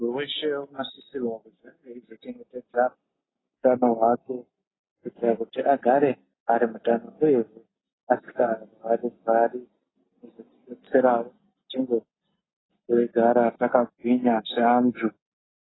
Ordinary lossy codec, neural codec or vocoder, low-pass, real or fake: AAC, 16 kbps; none; 7.2 kHz; real